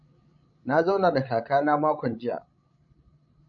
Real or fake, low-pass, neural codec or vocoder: fake; 7.2 kHz; codec, 16 kHz, 16 kbps, FreqCodec, larger model